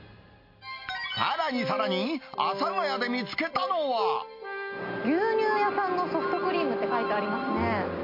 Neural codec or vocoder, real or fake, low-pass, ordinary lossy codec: none; real; 5.4 kHz; MP3, 32 kbps